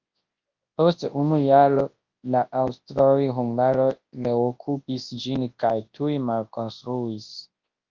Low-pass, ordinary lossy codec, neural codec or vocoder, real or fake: 7.2 kHz; Opus, 32 kbps; codec, 24 kHz, 0.9 kbps, WavTokenizer, large speech release; fake